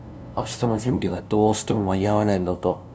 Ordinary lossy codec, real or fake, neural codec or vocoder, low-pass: none; fake; codec, 16 kHz, 0.5 kbps, FunCodec, trained on LibriTTS, 25 frames a second; none